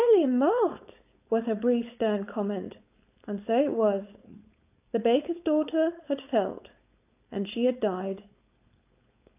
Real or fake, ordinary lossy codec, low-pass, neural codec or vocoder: fake; AAC, 32 kbps; 3.6 kHz; codec, 16 kHz, 4.8 kbps, FACodec